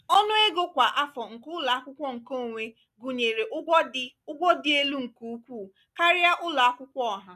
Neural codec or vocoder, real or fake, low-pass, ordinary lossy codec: none; real; 14.4 kHz; none